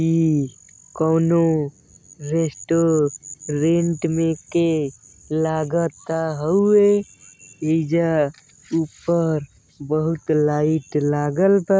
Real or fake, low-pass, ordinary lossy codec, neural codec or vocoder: real; none; none; none